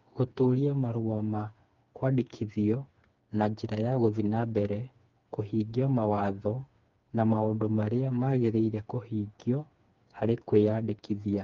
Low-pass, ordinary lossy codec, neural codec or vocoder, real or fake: 7.2 kHz; Opus, 32 kbps; codec, 16 kHz, 4 kbps, FreqCodec, smaller model; fake